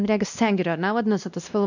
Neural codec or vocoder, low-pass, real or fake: codec, 16 kHz, 1 kbps, X-Codec, WavLM features, trained on Multilingual LibriSpeech; 7.2 kHz; fake